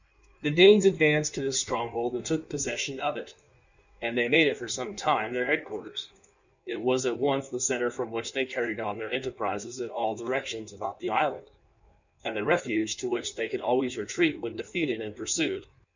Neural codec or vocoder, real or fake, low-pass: codec, 16 kHz in and 24 kHz out, 1.1 kbps, FireRedTTS-2 codec; fake; 7.2 kHz